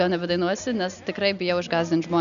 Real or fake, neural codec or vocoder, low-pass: real; none; 7.2 kHz